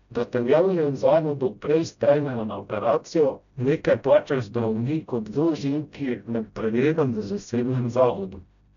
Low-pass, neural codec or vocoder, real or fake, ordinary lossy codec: 7.2 kHz; codec, 16 kHz, 0.5 kbps, FreqCodec, smaller model; fake; none